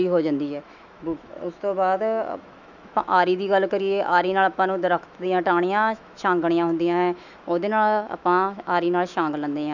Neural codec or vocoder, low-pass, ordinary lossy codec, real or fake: autoencoder, 48 kHz, 128 numbers a frame, DAC-VAE, trained on Japanese speech; 7.2 kHz; none; fake